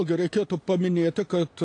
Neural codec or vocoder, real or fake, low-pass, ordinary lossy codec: none; real; 9.9 kHz; AAC, 48 kbps